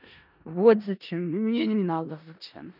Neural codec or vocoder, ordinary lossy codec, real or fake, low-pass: codec, 16 kHz in and 24 kHz out, 0.4 kbps, LongCat-Audio-Codec, four codebook decoder; none; fake; 5.4 kHz